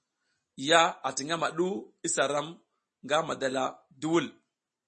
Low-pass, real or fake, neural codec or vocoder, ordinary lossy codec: 10.8 kHz; real; none; MP3, 32 kbps